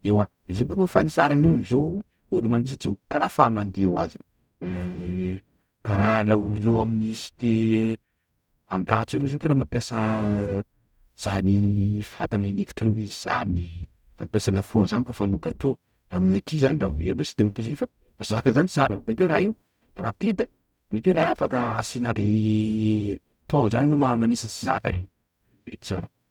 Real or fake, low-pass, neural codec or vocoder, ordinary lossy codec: fake; 19.8 kHz; codec, 44.1 kHz, 0.9 kbps, DAC; none